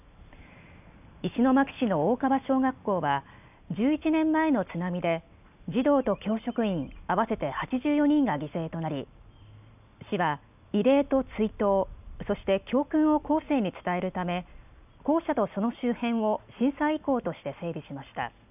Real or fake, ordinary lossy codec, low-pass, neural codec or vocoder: real; none; 3.6 kHz; none